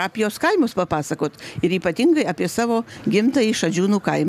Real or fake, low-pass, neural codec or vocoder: real; 14.4 kHz; none